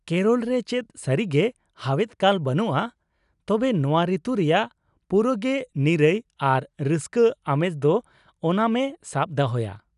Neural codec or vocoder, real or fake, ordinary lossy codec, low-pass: none; real; none; 10.8 kHz